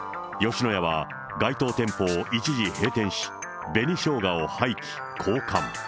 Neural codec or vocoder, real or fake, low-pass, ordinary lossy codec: none; real; none; none